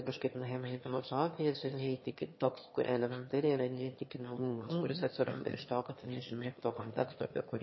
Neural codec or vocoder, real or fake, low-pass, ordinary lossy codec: autoencoder, 22.05 kHz, a latent of 192 numbers a frame, VITS, trained on one speaker; fake; 7.2 kHz; MP3, 24 kbps